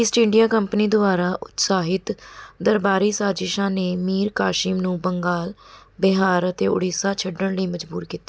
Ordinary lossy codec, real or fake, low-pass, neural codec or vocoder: none; real; none; none